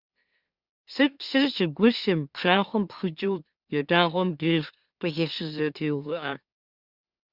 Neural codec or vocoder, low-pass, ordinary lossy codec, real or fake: autoencoder, 44.1 kHz, a latent of 192 numbers a frame, MeloTTS; 5.4 kHz; Opus, 64 kbps; fake